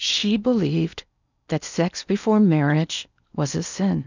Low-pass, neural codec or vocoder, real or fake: 7.2 kHz; codec, 16 kHz in and 24 kHz out, 0.8 kbps, FocalCodec, streaming, 65536 codes; fake